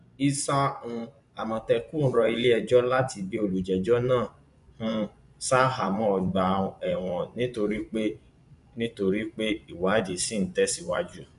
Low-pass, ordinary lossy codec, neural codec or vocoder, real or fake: 10.8 kHz; none; vocoder, 24 kHz, 100 mel bands, Vocos; fake